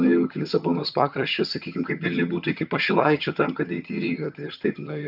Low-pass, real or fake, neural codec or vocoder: 5.4 kHz; fake; vocoder, 22.05 kHz, 80 mel bands, HiFi-GAN